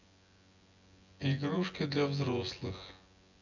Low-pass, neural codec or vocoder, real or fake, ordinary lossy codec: 7.2 kHz; vocoder, 24 kHz, 100 mel bands, Vocos; fake; none